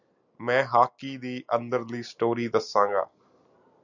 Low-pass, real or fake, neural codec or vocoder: 7.2 kHz; real; none